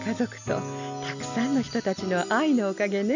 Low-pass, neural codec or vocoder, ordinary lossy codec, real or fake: 7.2 kHz; none; none; real